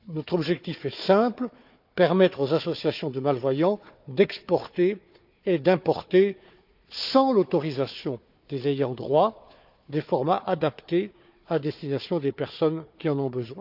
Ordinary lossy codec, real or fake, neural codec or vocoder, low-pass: none; fake; codec, 16 kHz, 4 kbps, FunCodec, trained on Chinese and English, 50 frames a second; 5.4 kHz